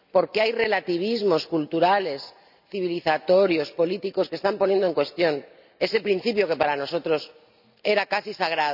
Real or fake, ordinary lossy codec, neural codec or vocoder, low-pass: real; none; none; 5.4 kHz